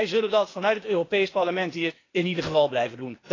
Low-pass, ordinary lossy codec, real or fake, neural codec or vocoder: 7.2 kHz; AAC, 32 kbps; fake; codec, 16 kHz, 0.8 kbps, ZipCodec